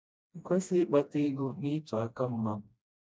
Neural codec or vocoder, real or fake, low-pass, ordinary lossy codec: codec, 16 kHz, 1 kbps, FreqCodec, smaller model; fake; none; none